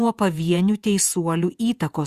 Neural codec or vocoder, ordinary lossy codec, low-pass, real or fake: vocoder, 48 kHz, 128 mel bands, Vocos; Opus, 64 kbps; 14.4 kHz; fake